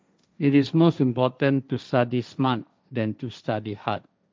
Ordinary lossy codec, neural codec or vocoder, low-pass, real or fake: none; codec, 16 kHz, 1.1 kbps, Voila-Tokenizer; none; fake